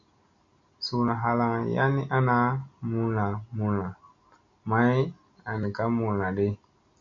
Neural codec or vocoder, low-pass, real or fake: none; 7.2 kHz; real